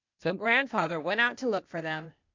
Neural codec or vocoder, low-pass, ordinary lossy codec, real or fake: codec, 16 kHz, 0.8 kbps, ZipCodec; 7.2 kHz; MP3, 64 kbps; fake